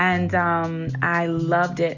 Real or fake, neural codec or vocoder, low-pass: real; none; 7.2 kHz